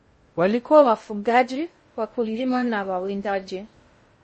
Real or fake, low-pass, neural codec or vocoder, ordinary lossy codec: fake; 10.8 kHz; codec, 16 kHz in and 24 kHz out, 0.6 kbps, FocalCodec, streaming, 2048 codes; MP3, 32 kbps